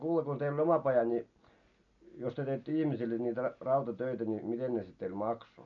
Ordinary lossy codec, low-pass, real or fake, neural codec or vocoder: none; 7.2 kHz; real; none